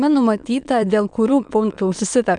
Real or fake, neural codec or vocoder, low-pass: fake; autoencoder, 22.05 kHz, a latent of 192 numbers a frame, VITS, trained on many speakers; 9.9 kHz